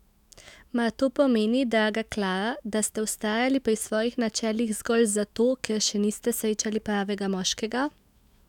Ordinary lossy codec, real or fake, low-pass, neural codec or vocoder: none; fake; 19.8 kHz; autoencoder, 48 kHz, 128 numbers a frame, DAC-VAE, trained on Japanese speech